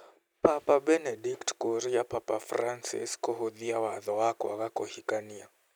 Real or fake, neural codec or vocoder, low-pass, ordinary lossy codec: real; none; none; none